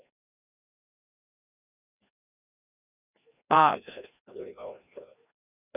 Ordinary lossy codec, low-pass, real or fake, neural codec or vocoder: none; 3.6 kHz; fake; codec, 16 kHz, 1 kbps, FreqCodec, larger model